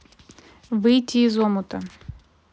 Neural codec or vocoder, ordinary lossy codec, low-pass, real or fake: none; none; none; real